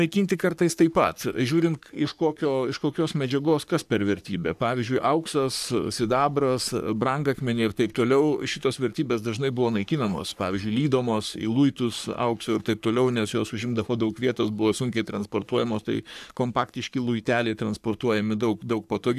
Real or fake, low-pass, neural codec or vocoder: fake; 14.4 kHz; codec, 44.1 kHz, 3.4 kbps, Pupu-Codec